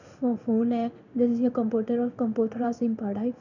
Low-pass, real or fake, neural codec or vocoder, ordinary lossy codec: 7.2 kHz; fake; codec, 16 kHz in and 24 kHz out, 1 kbps, XY-Tokenizer; none